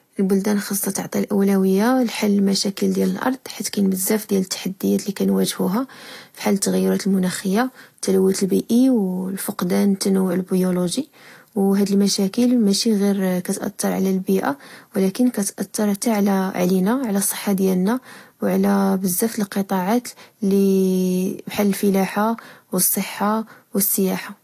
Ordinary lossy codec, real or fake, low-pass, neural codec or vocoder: AAC, 48 kbps; real; 14.4 kHz; none